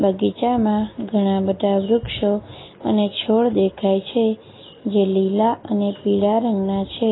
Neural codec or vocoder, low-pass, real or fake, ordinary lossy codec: none; 7.2 kHz; real; AAC, 16 kbps